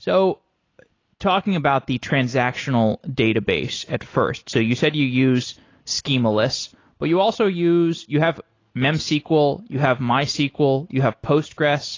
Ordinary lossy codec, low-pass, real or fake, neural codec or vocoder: AAC, 32 kbps; 7.2 kHz; real; none